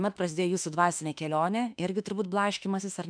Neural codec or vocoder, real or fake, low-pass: autoencoder, 48 kHz, 32 numbers a frame, DAC-VAE, trained on Japanese speech; fake; 9.9 kHz